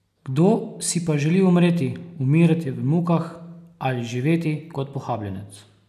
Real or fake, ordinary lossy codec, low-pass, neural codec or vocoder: real; none; 14.4 kHz; none